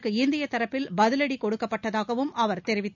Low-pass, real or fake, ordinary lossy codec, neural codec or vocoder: 7.2 kHz; real; none; none